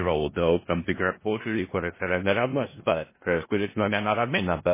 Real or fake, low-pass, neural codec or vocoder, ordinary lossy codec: fake; 3.6 kHz; codec, 16 kHz in and 24 kHz out, 0.4 kbps, LongCat-Audio-Codec, four codebook decoder; MP3, 16 kbps